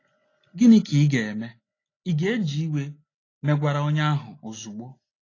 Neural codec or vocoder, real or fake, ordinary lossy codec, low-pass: none; real; AAC, 32 kbps; 7.2 kHz